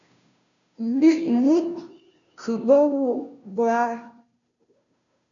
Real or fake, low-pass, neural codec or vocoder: fake; 7.2 kHz; codec, 16 kHz, 0.5 kbps, FunCodec, trained on Chinese and English, 25 frames a second